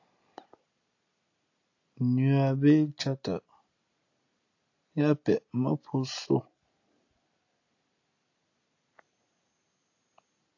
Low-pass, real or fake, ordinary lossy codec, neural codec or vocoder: 7.2 kHz; real; AAC, 48 kbps; none